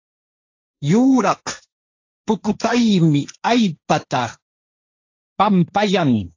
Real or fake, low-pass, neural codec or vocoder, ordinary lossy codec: fake; 7.2 kHz; codec, 16 kHz, 1.1 kbps, Voila-Tokenizer; AAC, 48 kbps